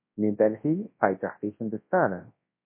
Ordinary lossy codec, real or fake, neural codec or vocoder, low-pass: MP3, 24 kbps; fake; codec, 24 kHz, 0.9 kbps, WavTokenizer, large speech release; 3.6 kHz